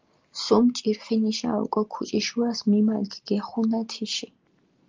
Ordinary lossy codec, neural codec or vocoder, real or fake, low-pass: Opus, 32 kbps; none; real; 7.2 kHz